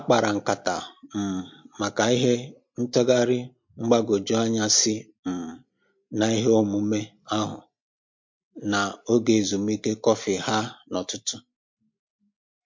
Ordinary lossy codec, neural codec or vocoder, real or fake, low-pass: MP3, 48 kbps; none; real; 7.2 kHz